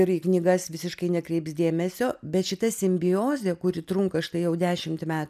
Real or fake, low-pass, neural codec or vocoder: real; 14.4 kHz; none